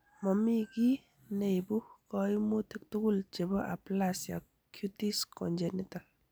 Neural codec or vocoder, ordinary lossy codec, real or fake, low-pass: none; none; real; none